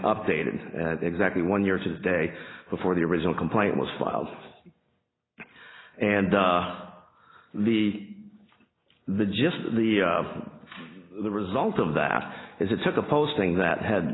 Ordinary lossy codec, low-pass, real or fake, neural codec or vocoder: AAC, 16 kbps; 7.2 kHz; real; none